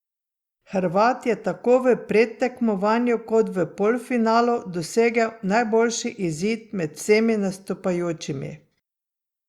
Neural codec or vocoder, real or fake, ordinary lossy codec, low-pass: none; real; Opus, 64 kbps; 19.8 kHz